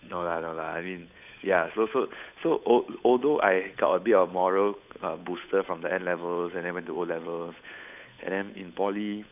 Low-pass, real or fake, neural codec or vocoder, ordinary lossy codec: 3.6 kHz; fake; codec, 16 kHz, 8 kbps, FunCodec, trained on Chinese and English, 25 frames a second; none